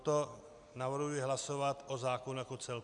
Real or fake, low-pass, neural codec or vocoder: real; 10.8 kHz; none